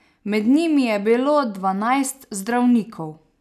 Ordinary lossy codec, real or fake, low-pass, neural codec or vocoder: none; real; 14.4 kHz; none